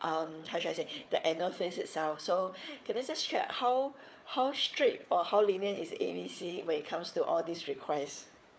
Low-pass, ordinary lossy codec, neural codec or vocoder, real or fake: none; none; codec, 16 kHz, 4 kbps, FunCodec, trained on Chinese and English, 50 frames a second; fake